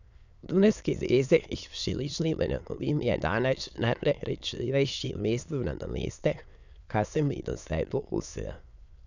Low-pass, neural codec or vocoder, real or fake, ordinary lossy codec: 7.2 kHz; autoencoder, 22.05 kHz, a latent of 192 numbers a frame, VITS, trained on many speakers; fake; none